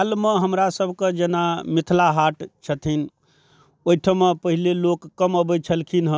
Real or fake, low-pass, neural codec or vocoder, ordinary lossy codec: real; none; none; none